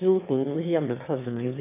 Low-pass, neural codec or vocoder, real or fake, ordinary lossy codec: 3.6 kHz; autoencoder, 22.05 kHz, a latent of 192 numbers a frame, VITS, trained on one speaker; fake; none